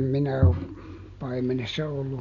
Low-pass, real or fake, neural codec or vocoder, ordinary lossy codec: 7.2 kHz; real; none; none